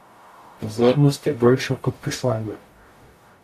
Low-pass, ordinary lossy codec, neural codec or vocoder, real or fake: 14.4 kHz; AAC, 64 kbps; codec, 44.1 kHz, 0.9 kbps, DAC; fake